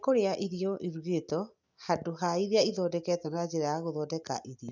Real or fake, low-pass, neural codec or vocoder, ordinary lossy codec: real; 7.2 kHz; none; none